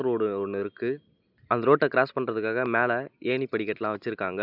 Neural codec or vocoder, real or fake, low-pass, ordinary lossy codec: none; real; 5.4 kHz; none